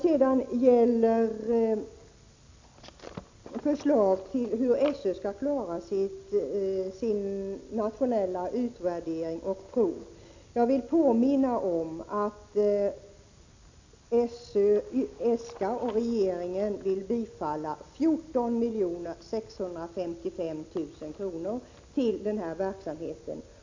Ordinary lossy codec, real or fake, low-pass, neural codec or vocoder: AAC, 48 kbps; real; 7.2 kHz; none